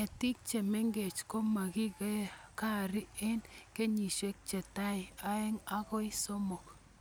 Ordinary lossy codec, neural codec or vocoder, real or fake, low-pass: none; none; real; none